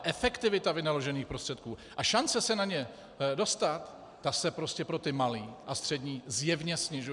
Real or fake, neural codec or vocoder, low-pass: real; none; 10.8 kHz